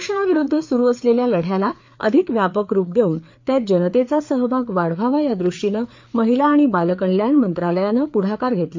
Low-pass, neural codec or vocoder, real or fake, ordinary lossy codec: 7.2 kHz; codec, 16 kHz, 4 kbps, FreqCodec, larger model; fake; MP3, 48 kbps